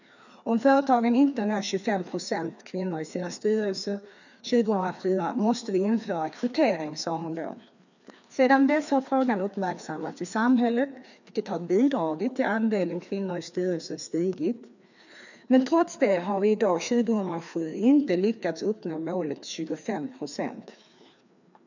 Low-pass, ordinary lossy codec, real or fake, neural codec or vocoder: 7.2 kHz; none; fake; codec, 16 kHz, 2 kbps, FreqCodec, larger model